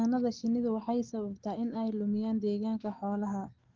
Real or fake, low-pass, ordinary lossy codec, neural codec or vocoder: real; 7.2 kHz; Opus, 16 kbps; none